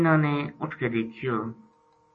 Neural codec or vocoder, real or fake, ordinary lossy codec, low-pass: none; real; MP3, 32 kbps; 9.9 kHz